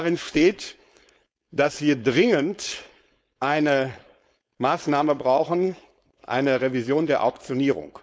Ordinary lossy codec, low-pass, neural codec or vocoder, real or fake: none; none; codec, 16 kHz, 4.8 kbps, FACodec; fake